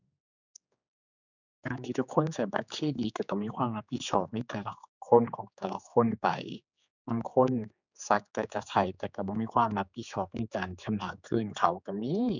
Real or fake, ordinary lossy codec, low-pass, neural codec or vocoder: fake; none; 7.2 kHz; codec, 16 kHz, 4 kbps, X-Codec, HuBERT features, trained on general audio